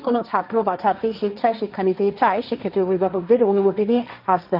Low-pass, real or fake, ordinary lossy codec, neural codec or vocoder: 5.4 kHz; fake; none; codec, 16 kHz, 1.1 kbps, Voila-Tokenizer